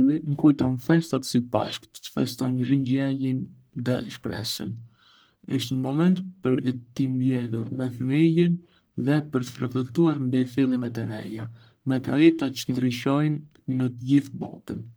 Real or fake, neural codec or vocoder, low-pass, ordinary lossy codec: fake; codec, 44.1 kHz, 1.7 kbps, Pupu-Codec; none; none